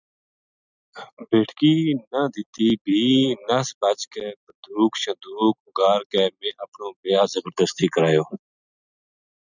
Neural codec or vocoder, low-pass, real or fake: none; 7.2 kHz; real